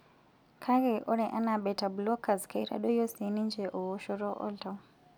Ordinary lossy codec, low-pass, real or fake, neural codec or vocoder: none; 19.8 kHz; real; none